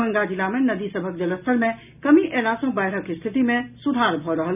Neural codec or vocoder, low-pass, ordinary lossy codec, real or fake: none; 3.6 kHz; none; real